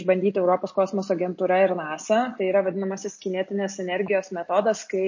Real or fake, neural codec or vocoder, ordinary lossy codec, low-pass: real; none; MP3, 32 kbps; 7.2 kHz